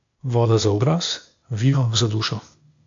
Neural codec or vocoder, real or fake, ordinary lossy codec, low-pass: codec, 16 kHz, 0.8 kbps, ZipCodec; fake; AAC, 64 kbps; 7.2 kHz